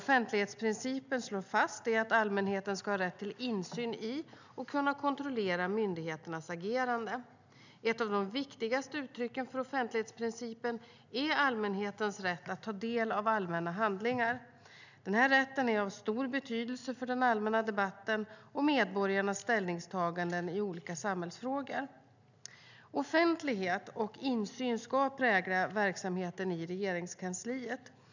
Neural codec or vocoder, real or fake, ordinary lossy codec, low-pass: none; real; none; 7.2 kHz